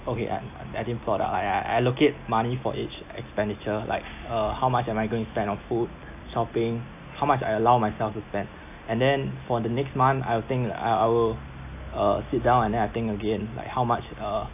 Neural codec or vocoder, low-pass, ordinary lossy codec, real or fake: none; 3.6 kHz; AAC, 32 kbps; real